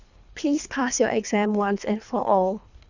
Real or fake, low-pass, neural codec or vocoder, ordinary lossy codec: fake; 7.2 kHz; codec, 24 kHz, 3 kbps, HILCodec; none